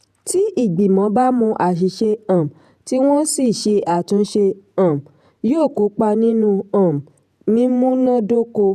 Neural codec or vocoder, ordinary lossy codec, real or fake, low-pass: vocoder, 48 kHz, 128 mel bands, Vocos; none; fake; 14.4 kHz